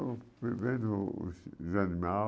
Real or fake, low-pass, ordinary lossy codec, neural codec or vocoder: real; none; none; none